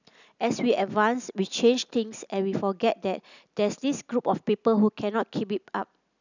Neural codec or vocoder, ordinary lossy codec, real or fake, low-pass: none; none; real; 7.2 kHz